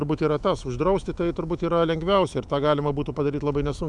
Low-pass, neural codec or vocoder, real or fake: 10.8 kHz; autoencoder, 48 kHz, 128 numbers a frame, DAC-VAE, trained on Japanese speech; fake